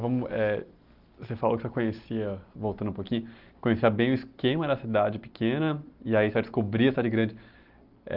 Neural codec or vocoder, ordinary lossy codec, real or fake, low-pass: none; Opus, 32 kbps; real; 5.4 kHz